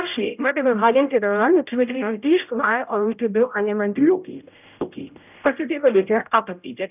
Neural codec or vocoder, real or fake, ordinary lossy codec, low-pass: codec, 16 kHz, 0.5 kbps, X-Codec, HuBERT features, trained on general audio; fake; none; 3.6 kHz